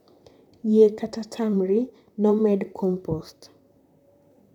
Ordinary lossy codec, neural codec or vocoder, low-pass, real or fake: none; vocoder, 44.1 kHz, 128 mel bands, Pupu-Vocoder; 19.8 kHz; fake